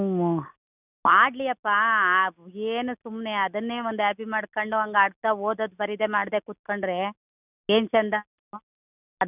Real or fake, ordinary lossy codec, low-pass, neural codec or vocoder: real; none; 3.6 kHz; none